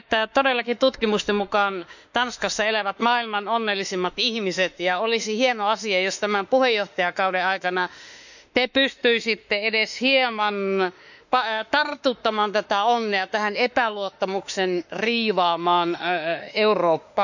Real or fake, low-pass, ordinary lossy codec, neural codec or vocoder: fake; 7.2 kHz; none; autoencoder, 48 kHz, 32 numbers a frame, DAC-VAE, trained on Japanese speech